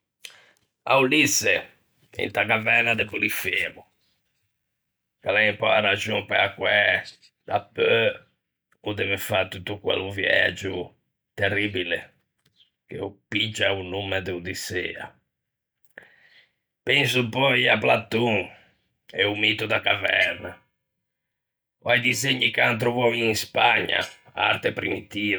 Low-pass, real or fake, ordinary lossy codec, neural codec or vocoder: none; real; none; none